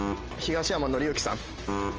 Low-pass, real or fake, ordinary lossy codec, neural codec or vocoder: 7.2 kHz; real; Opus, 24 kbps; none